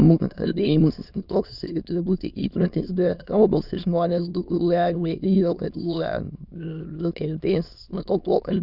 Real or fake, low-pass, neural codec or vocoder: fake; 5.4 kHz; autoencoder, 22.05 kHz, a latent of 192 numbers a frame, VITS, trained on many speakers